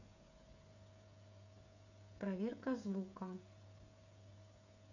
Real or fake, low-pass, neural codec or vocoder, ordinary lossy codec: fake; 7.2 kHz; codec, 16 kHz, 8 kbps, FreqCodec, smaller model; none